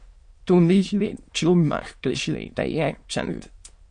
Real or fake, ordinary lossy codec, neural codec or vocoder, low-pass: fake; MP3, 48 kbps; autoencoder, 22.05 kHz, a latent of 192 numbers a frame, VITS, trained on many speakers; 9.9 kHz